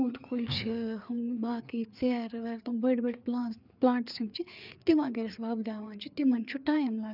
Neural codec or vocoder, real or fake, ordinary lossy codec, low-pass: codec, 16 kHz, 4 kbps, FreqCodec, larger model; fake; none; 5.4 kHz